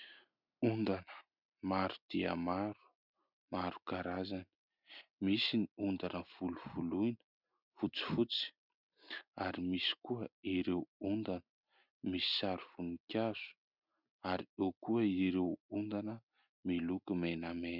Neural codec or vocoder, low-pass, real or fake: none; 5.4 kHz; real